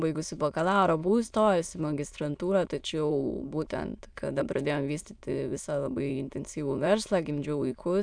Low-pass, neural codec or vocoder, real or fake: 9.9 kHz; autoencoder, 22.05 kHz, a latent of 192 numbers a frame, VITS, trained on many speakers; fake